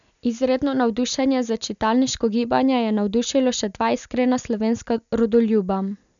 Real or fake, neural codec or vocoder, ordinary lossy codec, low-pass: real; none; none; 7.2 kHz